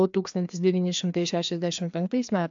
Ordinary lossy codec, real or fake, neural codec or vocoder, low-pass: AAC, 64 kbps; fake; codec, 16 kHz, 2 kbps, FreqCodec, larger model; 7.2 kHz